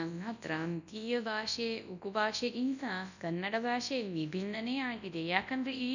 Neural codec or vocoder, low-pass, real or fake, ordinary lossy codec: codec, 24 kHz, 0.9 kbps, WavTokenizer, large speech release; 7.2 kHz; fake; none